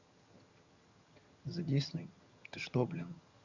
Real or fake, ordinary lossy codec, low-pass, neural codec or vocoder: fake; Opus, 64 kbps; 7.2 kHz; vocoder, 22.05 kHz, 80 mel bands, HiFi-GAN